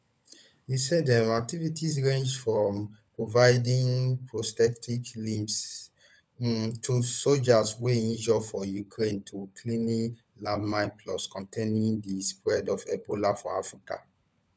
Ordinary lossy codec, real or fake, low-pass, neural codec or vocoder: none; fake; none; codec, 16 kHz, 16 kbps, FunCodec, trained on LibriTTS, 50 frames a second